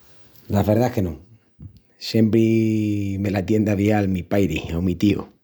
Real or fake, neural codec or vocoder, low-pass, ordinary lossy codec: real; none; none; none